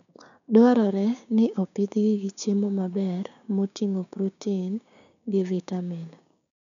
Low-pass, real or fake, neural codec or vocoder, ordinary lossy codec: 7.2 kHz; fake; codec, 16 kHz, 6 kbps, DAC; none